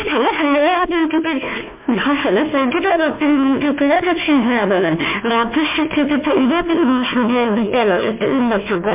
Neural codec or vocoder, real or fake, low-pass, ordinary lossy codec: codec, 16 kHz, 1 kbps, FunCodec, trained on Chinese and English, 50 frames a second; fake; 3.6 kHz; MP3, 32 kbps